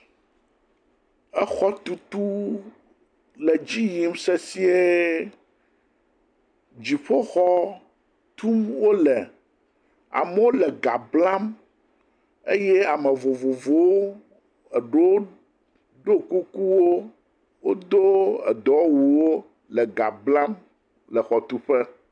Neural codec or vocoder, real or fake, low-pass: none; real; 9.9 kHz